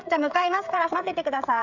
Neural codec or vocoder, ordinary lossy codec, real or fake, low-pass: codec, 16 kHz, 16 kbps, FreqCodec, smaller model; none; fake; 7.2 kHz